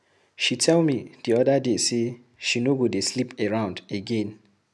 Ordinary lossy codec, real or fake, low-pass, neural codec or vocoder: none; real; none; none